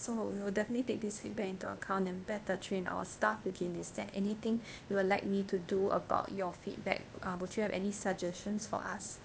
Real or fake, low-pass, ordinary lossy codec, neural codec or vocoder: fake; none; none; codec, 16 kHz, 0.8 kbps, ZipCodec